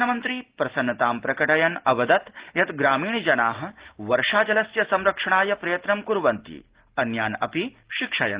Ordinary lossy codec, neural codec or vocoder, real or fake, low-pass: Opus, 16 kbps; none; real; 3.6 kHz